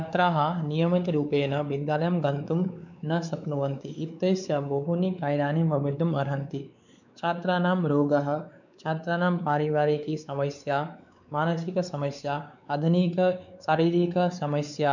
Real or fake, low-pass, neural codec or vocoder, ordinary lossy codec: fake; 7.2 kHz; codec, 16 kHz, 4 kbps, X-Codec, WavLM features, trained on Multilingual LibriSpeech; none